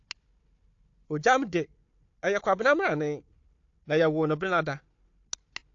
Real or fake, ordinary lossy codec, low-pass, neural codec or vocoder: fake; AAC, 48 kbps; 7.2 kHz; codec, 16 kHz, 4 kbps, FunCodec, trained on Chinese and English, 50 frames a second